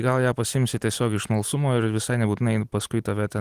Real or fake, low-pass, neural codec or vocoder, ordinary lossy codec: real; 14.4 kHz; none; Opus, 24 kbps